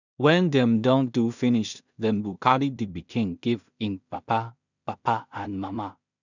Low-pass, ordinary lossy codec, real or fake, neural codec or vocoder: 7.2 kHz; none; fake; codec, 16 kHz in and 24 kHz out, 0.4 kbps, LongCat-Audio-Codec, two codebook decoder